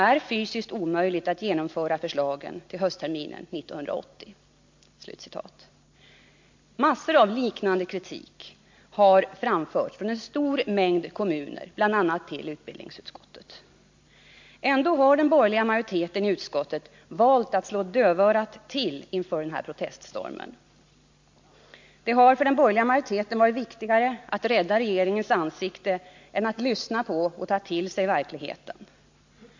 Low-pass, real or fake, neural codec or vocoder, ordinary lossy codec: 7.2 kHz; real; none; MP3, 48 kbps